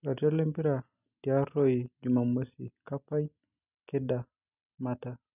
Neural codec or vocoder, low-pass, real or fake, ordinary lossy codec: none; 3.6 kHz; real; none